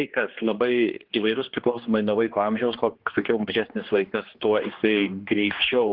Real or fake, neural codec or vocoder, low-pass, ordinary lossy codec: fake; codec, 16 kHz, 2 kbps, X-Codec, HuBERT features, trained on general audio; 5.4 kHz; Opus, 16 kbps